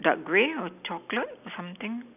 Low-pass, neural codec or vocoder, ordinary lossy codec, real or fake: 3.6 kHz; none; none; real